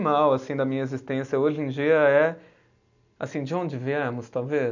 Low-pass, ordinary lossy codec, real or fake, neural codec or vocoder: 7.2 kHz; none; real; none